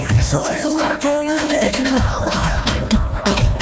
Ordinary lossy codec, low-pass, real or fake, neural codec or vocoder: none; none; fake; codec, 16 kHz, 1 kbps, FunCodec, trained on Chinese and English, 50 frames a second